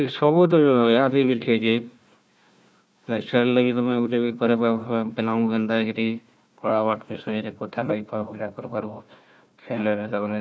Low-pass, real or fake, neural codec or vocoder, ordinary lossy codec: none; fake; codec, 16 kHz, 1 kbps, FunCodec, trained on Chinese and English, 50 frames a second; none